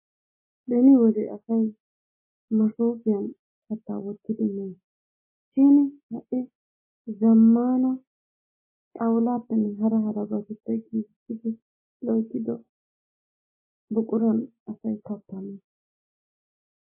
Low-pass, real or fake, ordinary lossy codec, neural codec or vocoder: 3.6 kHz; real; MP3, 16 kbps; none